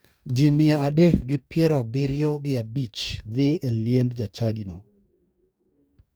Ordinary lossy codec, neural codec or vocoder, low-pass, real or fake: none; codec, 44.1 kHz, 2.6 kbps, DAC; none; fake